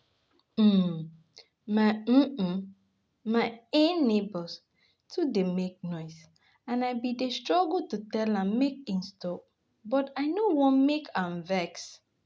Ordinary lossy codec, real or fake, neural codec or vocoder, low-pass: none; real; none; none